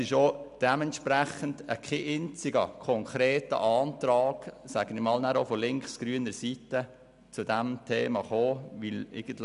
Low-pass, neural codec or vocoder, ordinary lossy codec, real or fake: 10.8 kHz; none; none; real